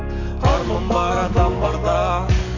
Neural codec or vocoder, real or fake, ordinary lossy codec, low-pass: codec, 44.1 kHz, 7.8 kbps, DAC; fake; none; 7.2 kHz